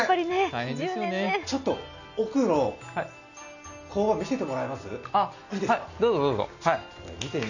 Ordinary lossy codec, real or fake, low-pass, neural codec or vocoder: none; real; 7.2 kHz; none